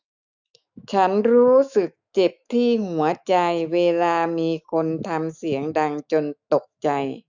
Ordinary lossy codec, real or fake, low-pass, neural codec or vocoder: none; fake; 7.2 kHz; autoencoder, 48 kHz, 128 numbers a frame, DAC-VAE, trained on Japanese speech